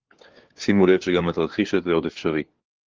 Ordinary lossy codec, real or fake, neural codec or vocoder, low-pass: Opus, 16 kbps; fake; codec, 16 kHz, 4 kbps, FunCodec, trained on LibriTTS, 50 frames a second; 7.2 kHz